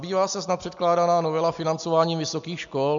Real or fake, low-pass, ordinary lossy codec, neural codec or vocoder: real; 7.2 kHz; MP3, 64 kbps; none